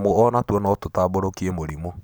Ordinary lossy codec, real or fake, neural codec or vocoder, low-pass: none; real; none; none